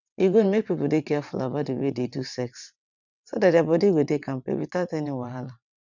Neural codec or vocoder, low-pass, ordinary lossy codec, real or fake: vocoder, 22.05 kHz, 80 mel bands, WaveNeXt; 7.2 kHz; none; fake